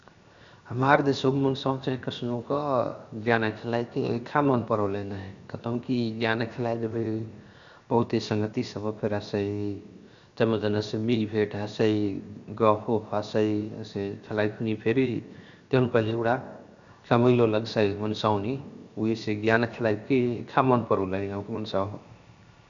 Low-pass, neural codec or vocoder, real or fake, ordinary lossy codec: 7.2 kHz; codec, 16 kHz, 0.7 kbps, FocalCodec; fake; Opus, 64 kbps